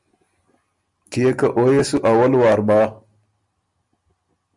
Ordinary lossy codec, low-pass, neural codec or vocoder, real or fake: Opus, 64 kbps; 10.8 kHz; none; real